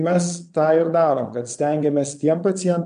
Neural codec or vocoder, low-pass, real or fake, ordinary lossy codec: vocoder, 22.05 kHz, 80 mel bands, Vocos; 9.9 kHz; fake; MP3, 64 kbps